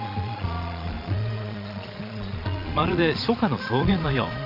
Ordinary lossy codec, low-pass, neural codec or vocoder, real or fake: none; 5.4 kHz; vocoder, 22.05 kHz, 80 mel bands, Vocos; fake